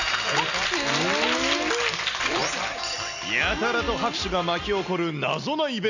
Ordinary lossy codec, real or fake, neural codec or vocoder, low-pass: none; real; none; 7.2 kHz